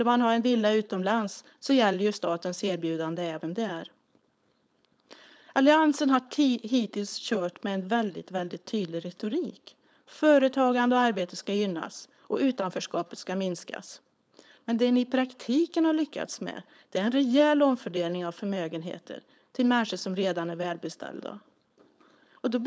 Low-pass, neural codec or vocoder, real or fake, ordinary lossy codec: none; codec, 16 kHz, 4.8 kbps, FACodec; fake; none